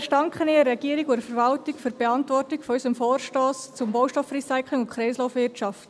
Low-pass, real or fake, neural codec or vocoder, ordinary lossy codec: none; real; none; none